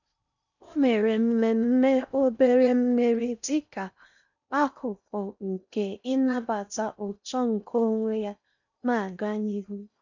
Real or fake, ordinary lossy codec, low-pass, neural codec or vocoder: fake; none; 7.2 kHz; codec, 16 kHz in and 24 kHz out, 0.6 kbps, FocalCodec, streaming, 4096 codes